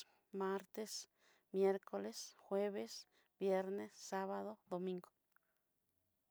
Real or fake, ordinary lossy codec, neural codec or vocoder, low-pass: real; none; none; none